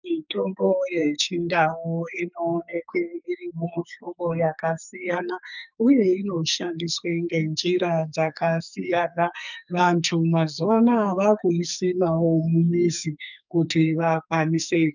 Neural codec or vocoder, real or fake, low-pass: codec, 44.1 kHz, 2.6 kbps, SNAC; fake; 7.2 kHz